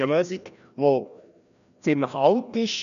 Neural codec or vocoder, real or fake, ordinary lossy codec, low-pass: codec, 16 kHz, 1 kbps, FreqCodec, larger model; fake; none; 7.2 kHz